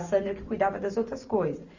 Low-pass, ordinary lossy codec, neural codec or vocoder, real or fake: 7.2 kHz; Opus, 64 kbps; none; real